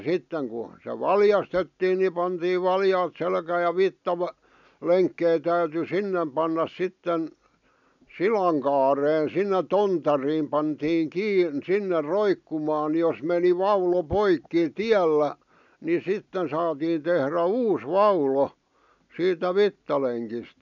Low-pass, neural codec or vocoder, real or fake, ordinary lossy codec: 7.2 kHz; none; real; none